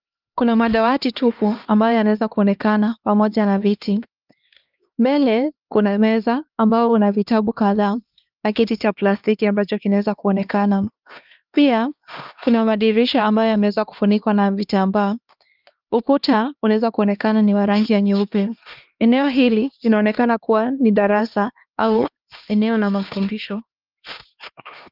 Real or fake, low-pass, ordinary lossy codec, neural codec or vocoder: fake; 5.4 kHz; Opus, 24 kbps; codec, 16 kHz, 2 kbps, X-Codec, HuBERT features, trained on LibriSpeech